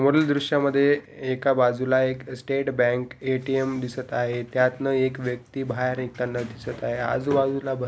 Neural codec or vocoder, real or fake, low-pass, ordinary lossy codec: none; real; none; none